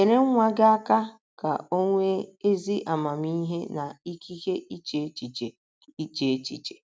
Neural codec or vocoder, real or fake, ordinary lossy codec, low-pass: none; real; none; none